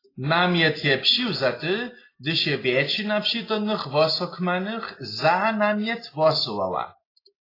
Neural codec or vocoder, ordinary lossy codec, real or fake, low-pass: none; AAC, 24 kbps; real; 5.4 kHz